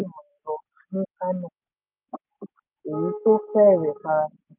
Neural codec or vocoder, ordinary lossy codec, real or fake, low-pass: none; none; real; 3.6 kHz